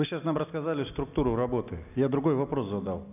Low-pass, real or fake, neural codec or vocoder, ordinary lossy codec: 3.6 kHz; real; none; none